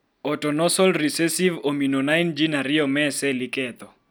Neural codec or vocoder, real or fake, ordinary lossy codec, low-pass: none; real; none; none